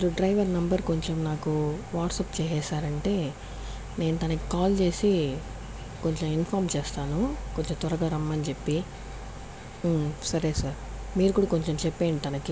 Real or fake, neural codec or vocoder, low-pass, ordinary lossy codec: real; none; none; none